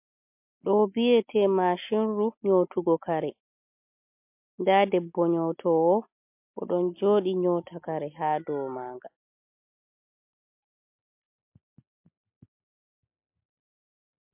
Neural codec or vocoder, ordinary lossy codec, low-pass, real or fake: none; MP3, 32 kbps; 3.6 kHz; real